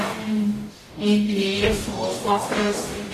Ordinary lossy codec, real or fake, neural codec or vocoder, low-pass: AAC, 48 kbps; fake; codec, 44.1 kHz, 0.9 kbps, DAC; 14.4 kHz